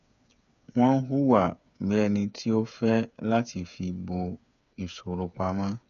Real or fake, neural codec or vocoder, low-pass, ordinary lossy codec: fake; codec, 16 kHz, 8 kbps, FreqCodec, smaller model; 7.2 kHz; none